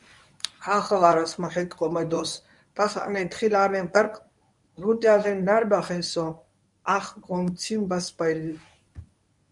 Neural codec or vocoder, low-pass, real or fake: codec, 24 kHz, 0.9 kbps, WavTokenizer, medium speech release version 1; 10.8 kHz; fake